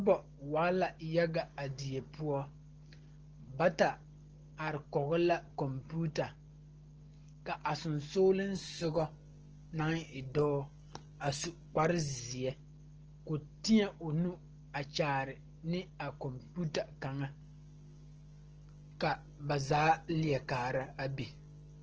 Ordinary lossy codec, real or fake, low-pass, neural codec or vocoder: Opus, 16 kbps; real; 7.2 kHz; none